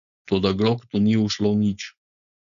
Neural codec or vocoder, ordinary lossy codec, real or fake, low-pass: codec, 16 kHz, 4.8 kbps, FACodec; none; fake; 7.2 kHz